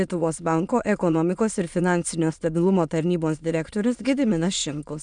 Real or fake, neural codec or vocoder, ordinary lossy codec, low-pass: fake; autoencoder, 22.05 kHz, a latent of 192 numbers a frame, VITS, trained on many speakers; MP3, 96 kbps; 9.9 kHz